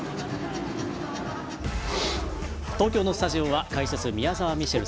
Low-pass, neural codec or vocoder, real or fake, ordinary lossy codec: none; none; real; none